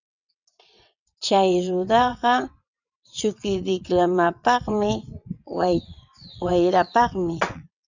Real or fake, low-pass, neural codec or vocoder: fake; 7.2 kHz; vocoder, 22.05 kHz, 80 mel bands, WaveNeXt